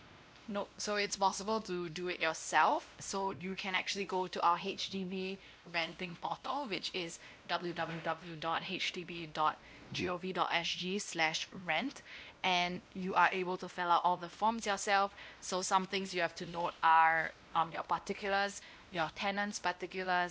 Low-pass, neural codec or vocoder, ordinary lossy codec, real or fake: none; codec, 16 kHz, 1 kbps, X-Codec, WavLM features, trained on Multilingual LibriSpeech; none; fake